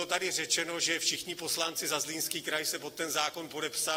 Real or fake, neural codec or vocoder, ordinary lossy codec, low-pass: real; none; AAC, 48 kbps; 19.8 kHz